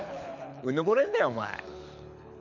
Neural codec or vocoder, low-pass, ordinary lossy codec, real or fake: codec, 24 kHz, 6 kbps, HILCodec; 7.2 kHz; none; fake